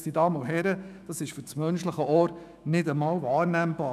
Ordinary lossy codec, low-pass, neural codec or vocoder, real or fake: none; 14.4 kHz; autoencoder, 48 kHz, 128 numbers a frame, DAC-VAE, trained on Japanese speech; fake